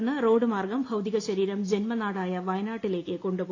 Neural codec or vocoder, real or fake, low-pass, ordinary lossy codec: none; real; 7.2 kHz; AAC, 32 kbps